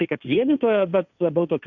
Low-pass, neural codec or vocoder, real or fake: 7.2 kHz; codec, 16 kHz, 1.1 kbps, Voila-Tokenizer; fake